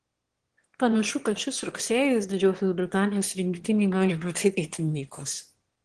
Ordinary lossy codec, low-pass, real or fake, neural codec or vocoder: Opus, 16 kbps; 9.9 kHz; fake; autoencoder, 22.05 kHz, a latent of 192 numbers a frame, VITS, trained on one speaker